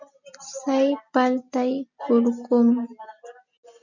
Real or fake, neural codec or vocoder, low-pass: real; none; 7.2 kHz